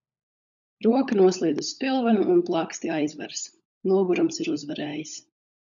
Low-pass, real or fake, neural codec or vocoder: 7.2 kHz; fake; codec, 16 kHz, 16 kbps, FunCodec, trained on LibriTTS, 50 frames a second